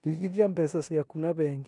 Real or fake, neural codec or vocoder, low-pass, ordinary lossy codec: fake; codec, 16 kHz in and 24 kHz out, 0.9 kbps, LongCat-Audio-Codec, four codebook decoder; 10.8 kHz; none